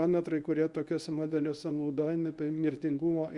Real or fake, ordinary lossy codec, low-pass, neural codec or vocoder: fake; Opus, 64 kbps; 10.8 kHz; codec, 24 kHz, 0.9 kbps, WavTokenizer, medium speech release version 1